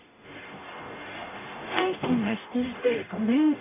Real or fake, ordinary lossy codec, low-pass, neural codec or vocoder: fake; none; 3.6 kHz; codec, 44.1 kHz, 0.9 kbps, DAC